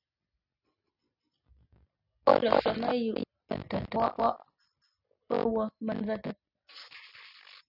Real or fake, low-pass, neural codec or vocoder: real; 5.4 kHz; none